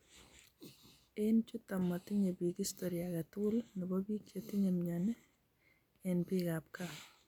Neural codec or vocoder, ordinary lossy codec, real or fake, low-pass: none; none; real; 19.8 kHz